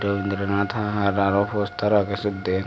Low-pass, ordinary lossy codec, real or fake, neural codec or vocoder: none; none; real; none